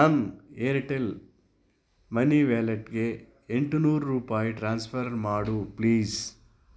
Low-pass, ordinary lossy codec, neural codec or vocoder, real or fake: none; none; none; real